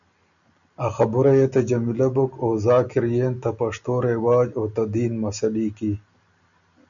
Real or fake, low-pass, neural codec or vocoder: real; 7.2 kHz; none